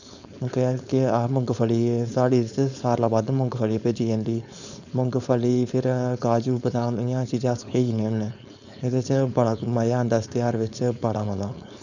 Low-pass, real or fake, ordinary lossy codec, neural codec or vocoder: 7.2 kHz; fake; none; codec, 16 kHz, 4.8 kbps, FACodec